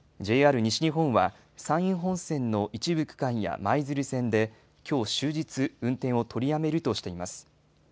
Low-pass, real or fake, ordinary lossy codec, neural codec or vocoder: none; real; none; none